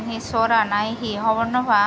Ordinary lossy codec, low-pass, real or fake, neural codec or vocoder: none; none; real; none